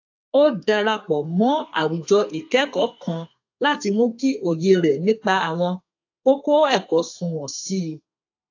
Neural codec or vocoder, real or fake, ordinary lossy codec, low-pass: codec, 32 kHz, 1.9 kbps, SNAC; fake; AAC, 48 kbps; 7.2 kHz